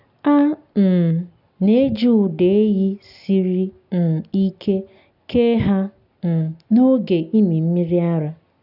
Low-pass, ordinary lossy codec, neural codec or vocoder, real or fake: 5.4 kHz; none; none; real